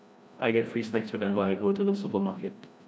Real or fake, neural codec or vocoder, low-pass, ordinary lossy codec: fake; codec, 16 kHz, 1 kbps, FreqCodec, larger model; none; none